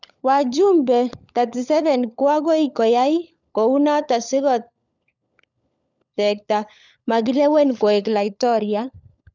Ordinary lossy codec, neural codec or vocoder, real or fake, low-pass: none; codec, 16 kHz, 16 kbps, FunCodec, trained on LibriTTS, 50 frames a second; fake; 7.2 kHz